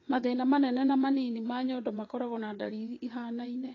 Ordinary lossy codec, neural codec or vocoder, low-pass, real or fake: AAC, 32 kbps; vocoder, 22.05 kHz, 80 mel bands, WaveNeXt; 7.2 kHz; fake